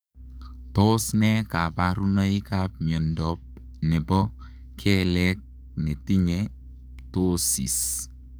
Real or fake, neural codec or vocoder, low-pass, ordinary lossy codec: fake; codec, 44.1 kHz, 7.8 kbps, DAC; none; none